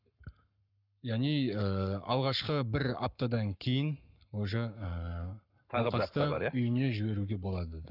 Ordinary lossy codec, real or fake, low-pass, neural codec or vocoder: none; fake; 5.4 kHz; codec, 44.1 kHz, 7.8 kbps, Pupu-Codec